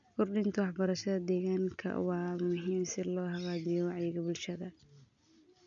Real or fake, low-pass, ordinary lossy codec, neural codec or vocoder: real; 7.2 kHz; none; none